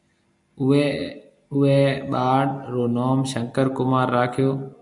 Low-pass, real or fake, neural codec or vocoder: 10.8 kHz; real; none